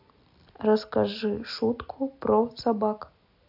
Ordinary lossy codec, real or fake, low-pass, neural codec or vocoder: AAC, 48 kbps; real; 5.4 kHz; none